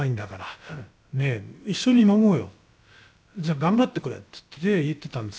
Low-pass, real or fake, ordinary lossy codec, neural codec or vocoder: none; fake; none; codec, 16 kHz, about 1 kbps, DyCAST, with the encoder's durations